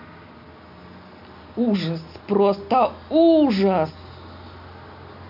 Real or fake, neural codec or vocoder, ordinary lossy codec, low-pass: real; none; MP3, 48 kbps; 5.4 kHz